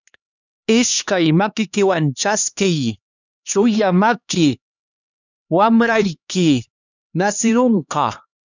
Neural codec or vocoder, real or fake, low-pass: codec, 16 kHz, 2 kbps, X-Codec, HuBERT features, trained on LibriSpeech; fake; 7.2 kHz